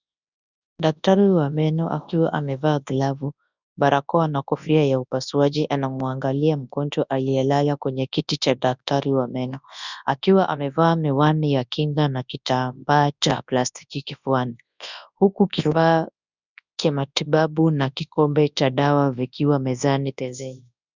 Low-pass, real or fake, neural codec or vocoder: 7.2 kHz; fake; codec, 24 kHz, 0.9 kbps, WavTokenizer, large speech release